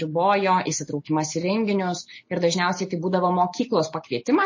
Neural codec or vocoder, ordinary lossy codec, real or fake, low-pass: none; MP3, 32 kbps; real; 7.2 kHz